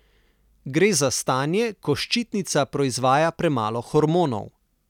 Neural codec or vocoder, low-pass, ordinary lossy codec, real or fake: none; 19.8 kHz; none; real